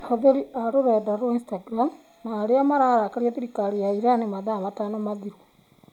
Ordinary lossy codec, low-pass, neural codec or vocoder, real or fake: none; 19.8 kHz; none; real